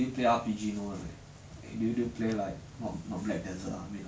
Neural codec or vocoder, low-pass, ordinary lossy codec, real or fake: none; none; none; real